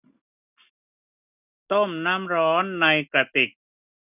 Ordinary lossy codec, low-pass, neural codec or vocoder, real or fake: none; 3.6 kHz; none; real